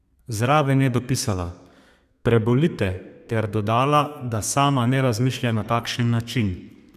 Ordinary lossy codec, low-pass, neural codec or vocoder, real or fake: none; 14.4 kHz; codec, 32 kHz, 1.9 kbps, SNAC; fake